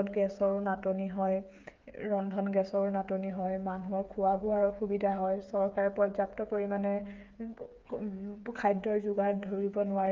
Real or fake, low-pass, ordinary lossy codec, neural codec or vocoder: fake; 7.2 kHz; Opus, 32 kbps; codec, 16 kHz, 8 kbps, FreqCodec, smaller model